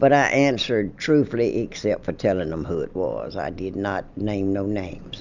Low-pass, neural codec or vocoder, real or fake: 7.2 kHz; none; real